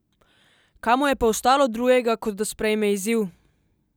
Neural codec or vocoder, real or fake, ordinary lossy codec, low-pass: none; real; none; none